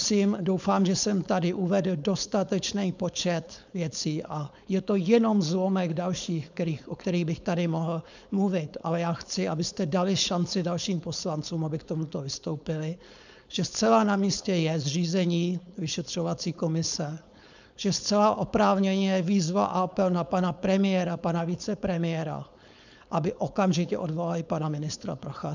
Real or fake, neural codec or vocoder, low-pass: fake; codec, 16 kHz, 4.8 kbps, FACodec; 7.2 kHz